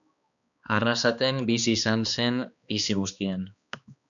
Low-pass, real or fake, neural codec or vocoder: 7.2 kHz; fake; codec, 16 kHz, 2 kbps, X-Codec, HuBERT features, trained on balanced general audio